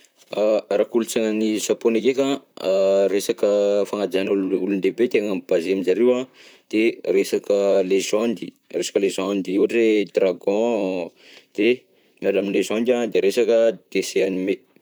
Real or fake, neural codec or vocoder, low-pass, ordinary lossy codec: fake; vocoder, 44.1 kHz, 128 mel bands, Pupu-Vocoder; none; none